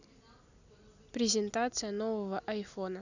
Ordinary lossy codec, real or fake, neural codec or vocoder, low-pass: none; real; none; 7.2 kHz